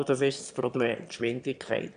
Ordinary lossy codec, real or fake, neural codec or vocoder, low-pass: none; fake; autoencoder, 22.05 kHz, a latent of 192 numbers a frame, VITS, trained on one speaker; 9.9 kHz